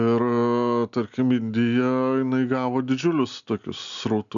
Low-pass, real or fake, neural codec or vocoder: 7.2 kHz; real; none